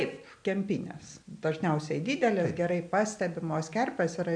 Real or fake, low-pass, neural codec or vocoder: real; 9.9 kHz; none